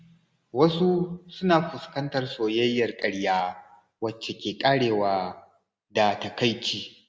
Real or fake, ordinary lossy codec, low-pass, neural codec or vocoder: real; none; none; none